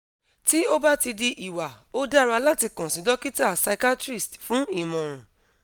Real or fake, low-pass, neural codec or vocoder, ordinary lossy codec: real; none; none; none